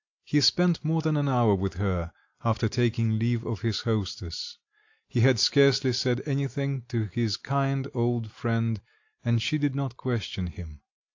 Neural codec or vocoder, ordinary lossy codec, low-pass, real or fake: none; AAC, 48 kbps; 7.2 kHz; real